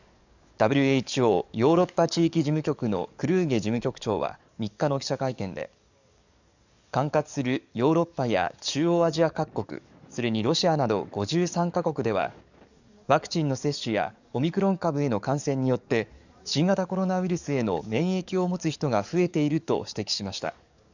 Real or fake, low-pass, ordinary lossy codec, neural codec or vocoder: fake; 7.2 kHz; none; codec, 44.1 kHz, 7.8 kbps, DAC